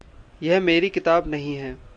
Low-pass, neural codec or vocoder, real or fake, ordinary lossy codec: 9.9 kHz; none; real; MP3, 64 kbps